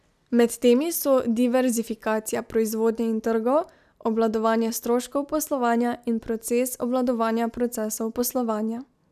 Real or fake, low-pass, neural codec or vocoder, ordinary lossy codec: real; 14.4 kHz; none; none